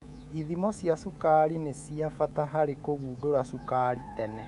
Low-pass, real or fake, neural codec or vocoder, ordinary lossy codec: 10.8 kHz; fake; codec, 24 kHz, 3.1 kbps, DualCodec; none